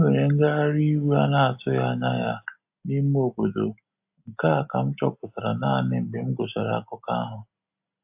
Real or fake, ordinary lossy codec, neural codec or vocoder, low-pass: real; AAC, 32 kbps; none; 3.6 kHz